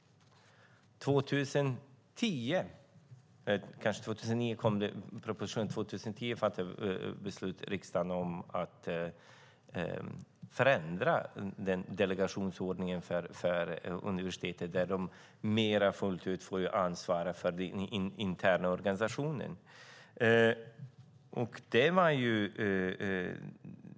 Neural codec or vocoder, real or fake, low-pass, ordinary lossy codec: none; real; none; none